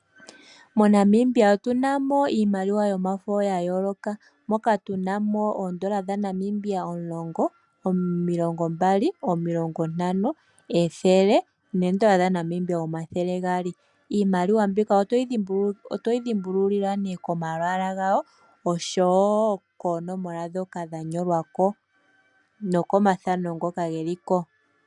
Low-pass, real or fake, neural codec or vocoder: 10.8 kHz; real; none